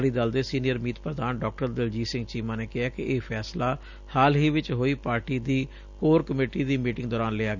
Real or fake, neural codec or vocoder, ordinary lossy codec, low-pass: real; none; none; 7.2 kHz